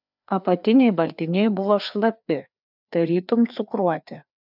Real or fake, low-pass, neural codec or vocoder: fake; 5.4 kHz; codec, 16 kHz, 2 kbps, FreqCodec, larger model